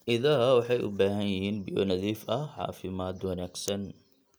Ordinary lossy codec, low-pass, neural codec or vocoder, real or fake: none; none; none; real